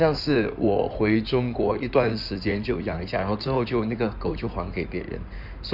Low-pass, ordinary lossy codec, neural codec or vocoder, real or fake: 5.4 kHz; none; codec, 16 kHz in and 24 kHz out, 2.2 kbps, FireRedTTS-2 codec; fake